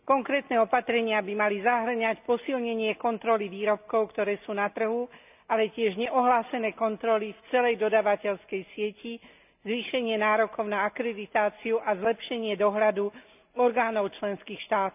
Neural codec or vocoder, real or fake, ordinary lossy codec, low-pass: none; real; none; 3.6 kHz